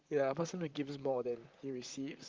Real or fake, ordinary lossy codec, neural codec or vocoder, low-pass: fake; Opus, 32 kbps; vocoder, 44.1 kHz, 128 mel bands, Pupu-Vocoder; 7.2 kHz